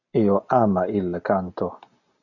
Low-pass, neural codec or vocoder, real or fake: 7.2 kHz; none; real